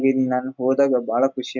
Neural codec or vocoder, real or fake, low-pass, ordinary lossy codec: none; real; 7.2 kHz; MP3, 64 kbps